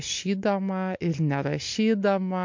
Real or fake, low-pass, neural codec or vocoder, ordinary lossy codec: real; 7.2 kHz; none; MP3, 48 kbps